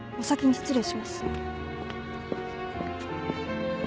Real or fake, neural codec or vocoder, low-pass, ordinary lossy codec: real; none; none; none